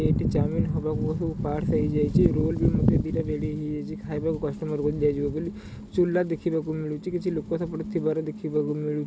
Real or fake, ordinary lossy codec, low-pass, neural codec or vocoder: real; none; none; none